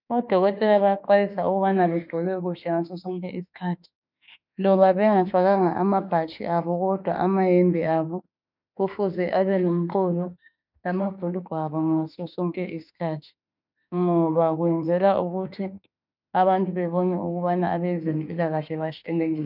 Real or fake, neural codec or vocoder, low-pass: fake; autoencoder, 48 kHz, 32 numbers a frame, DAC-VAE, trained on Japanese speech; 5.4 kHz